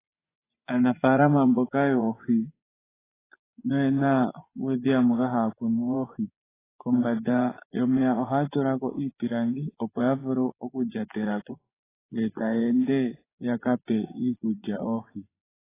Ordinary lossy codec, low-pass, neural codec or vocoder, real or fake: AAC, 16 kbps; 3.6 kHz; vocoder, 24 kHz, 100 mel bands, Vocos; fake